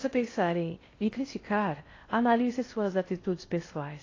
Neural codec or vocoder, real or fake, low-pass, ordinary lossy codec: codec, 16 kHz in and 24 kHz out, 0.6 kbps, FocalCodec, streaming, 4096 codes; fake; 7.2 kHz; AAC, 32 kbps